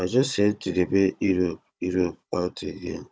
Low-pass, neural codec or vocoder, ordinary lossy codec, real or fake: none; codec, 16 kHz, 16 kbps, FunCodec, trained on Chinese and English, 50 frames a second; none; fake